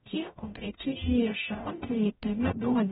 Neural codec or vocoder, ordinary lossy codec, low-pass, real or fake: codec, 44.1 kHz, 0.9 kbps, DAC; AAC, 16 kbps; 19.8 kHz; fake